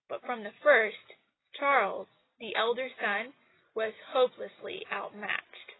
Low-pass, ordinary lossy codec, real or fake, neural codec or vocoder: 7.2 kHz; AAC, 16 kbps; real; none